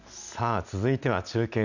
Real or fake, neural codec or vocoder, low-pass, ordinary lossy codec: real; none; 7.2 kHz; none